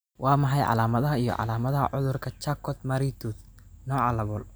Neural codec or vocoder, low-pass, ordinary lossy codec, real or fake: none; none; none; real